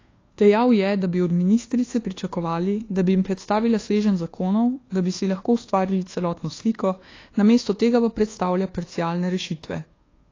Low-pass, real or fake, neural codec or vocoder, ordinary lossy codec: 7.2 kHz; fake; codec, 24 kHz, 1.2 kbps, DualCodec; AAC, 32 kbps